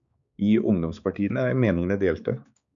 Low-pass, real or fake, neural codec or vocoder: 7.2 kHz; fake; codec, 16 kHz, 4 kbps, X-Codec, HuBERT features, trained on balanced general audio